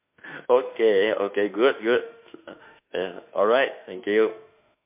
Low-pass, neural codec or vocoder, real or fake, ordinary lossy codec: 3.6 kHz; autoencoder, 48 kHz, 32 numbers a frame, DAC-VAE, trained on Japanese speech; fake; MP3, 32 kbps